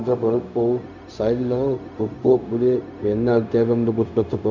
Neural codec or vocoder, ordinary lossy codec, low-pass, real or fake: codec, 16 kHz, 0.4 kbps, LongCat-Audio-Codec; none; 7.2 kHz; fake